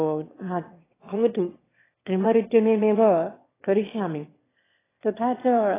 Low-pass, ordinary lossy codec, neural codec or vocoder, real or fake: 3.6 kHz; AAC, 16 kbps; autoencoder, 22.05 kHz, a latent of 192 numbers a frame, VITS, trained on one speaker; fake